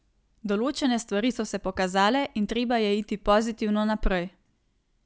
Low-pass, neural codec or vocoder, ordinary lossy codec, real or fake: none; none; none; real